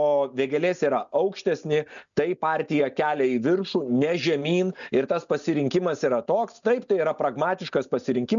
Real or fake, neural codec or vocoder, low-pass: real; none; 7.2 kHz